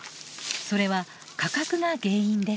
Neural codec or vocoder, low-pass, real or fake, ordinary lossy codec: none; none; real; none